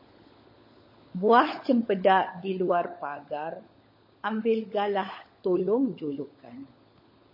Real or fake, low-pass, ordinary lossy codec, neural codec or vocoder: fake; 5.4 kHz; MP3, 24 kbps; codec, 16 kHz, 16 kbps, FunCodec, trained on LibriTTS, 50 frames a second